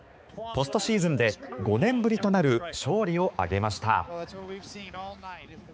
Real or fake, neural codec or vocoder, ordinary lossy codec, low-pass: fake; codec, 16 kHz, 4 kbps, X-Codec, HuBERT features, trained on balanced general audio; none; none